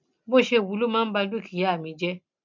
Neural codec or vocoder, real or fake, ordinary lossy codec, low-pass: none; real; MP3, 64 kbps; 7.2 kHz